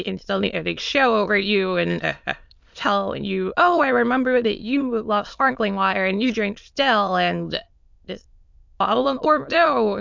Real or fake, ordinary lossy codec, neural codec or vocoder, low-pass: fake; MP3, 64 kbps; autoencoder, 22.05 kHz, a latent of 192 numbers a frame, VITS, trained on many speakers; 7.2 kHz